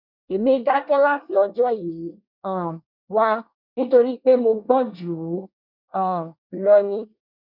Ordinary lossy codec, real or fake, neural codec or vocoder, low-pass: none; fake; codec, 24 kHz, 1 kbps, SNAC; 5.4 kHz